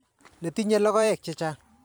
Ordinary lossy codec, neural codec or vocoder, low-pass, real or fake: none; vocoder, 44.1 kHz, 128 mel bands every 512 samples, BigVGAN v2; none; fake